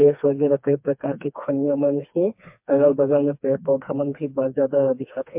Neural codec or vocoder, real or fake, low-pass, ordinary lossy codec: codec, 16 kHz, 2 kbps, FreqCodec, smaller model; fake; 3.6 kHz; MP3, 32 kbps